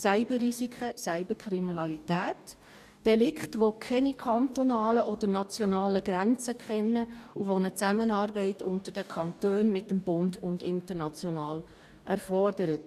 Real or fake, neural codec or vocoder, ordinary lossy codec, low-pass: fake; codec, 44.1 kHz, 2.6 kbps, DAC; none; 14.4 kHz